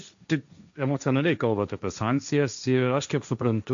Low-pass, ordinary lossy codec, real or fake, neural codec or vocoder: 7.2 kHz; MP3, 96 kbps; fake; codec, 16 kHz, 1.1 kbps, Voila-Tokenizer